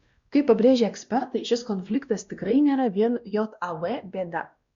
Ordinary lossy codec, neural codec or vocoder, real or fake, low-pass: Opus, 64 kbps; codec, 16 kHz, 1 kbps, X-Codec, WavLM features, trained on Multilingual LibriSpeech; fake; 7.2 kHz